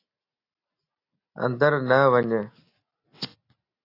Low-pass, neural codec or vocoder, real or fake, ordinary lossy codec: 5.4 kHz; none; real; AAC, 32 kbps